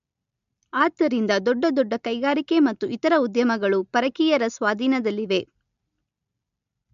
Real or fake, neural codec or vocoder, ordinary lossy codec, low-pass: real; none; MP3, 64 kbps; 7.2 kHz